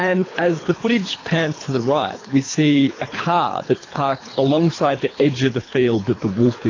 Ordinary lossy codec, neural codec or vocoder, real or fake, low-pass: AAC, 32 kbps; codec, 24 kHz, 3 kbps, HILCodec; fake; 7.2 kHz